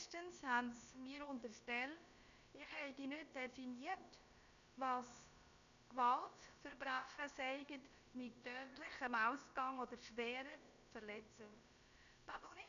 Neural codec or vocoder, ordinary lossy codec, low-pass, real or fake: codec, 16 kHz, about 1 kbps, DyCAST, with the encoder's durations; none; 7.2 kHz; fake